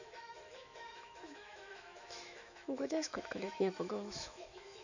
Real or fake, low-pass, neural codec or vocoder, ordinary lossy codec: fake; 7.2 kHz; autoencoder, 48 kHz, 128 numbers a frame, DAC-VAE, trained on Japanese speech; none